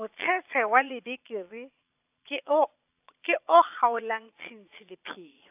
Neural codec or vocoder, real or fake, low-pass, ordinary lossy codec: vocoder, 22.05 kHz, 80 mel bands, Vocos; fake; 3.6 kHz; AAC, 32 kbps